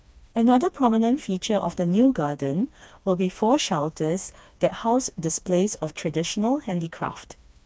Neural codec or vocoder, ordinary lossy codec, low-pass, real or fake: codec, 16 kHz, 2 kbps, FreqCodec, smaller model; none; none; fake